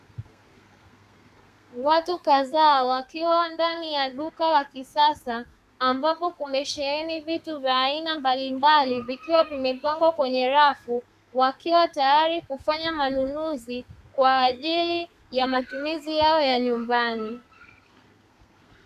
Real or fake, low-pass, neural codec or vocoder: fake; 14.4 kHz; codec, 32 kHz, 1.9 kbps, SNAC